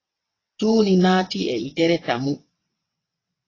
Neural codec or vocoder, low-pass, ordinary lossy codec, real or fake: vocoder, 22.05 kHz, 80 mel bands, WaveNeXt; 7.2 kHz; AAC, 32 kbps; fake